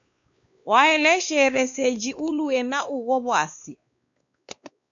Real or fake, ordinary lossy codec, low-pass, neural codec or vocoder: fake; MP3, 64 kbps; 7.2 kHz; codec, 16 kHz, 2 kbps, X-Codec, WavLM features, trained on Multilingual LibriSpeech